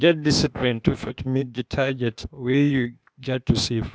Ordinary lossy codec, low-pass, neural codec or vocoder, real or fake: none; none; codec, 16 kHz, 0.8 kbps, ZipCodec; fake